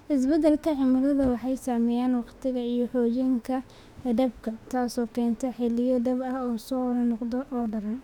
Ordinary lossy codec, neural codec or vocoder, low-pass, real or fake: none; autoencoder, 48 kHz, 32 numbers a frame, DAC-VAE, trained on Japanese speech; 19.8 kHz; fake